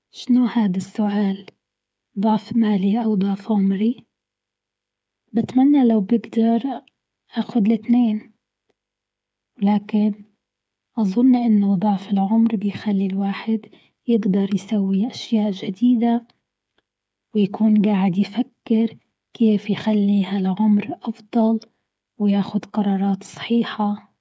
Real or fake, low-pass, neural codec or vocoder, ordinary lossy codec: fake; none; codec, 16 kHz, 8 kbps, FreqCodec, smaller model; none